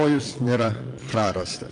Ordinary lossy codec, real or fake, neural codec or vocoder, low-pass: MP3, 48 kbps; fake; vocoder, 22.05 kHz, 80 mel bands, Vocos; 9.9 kHz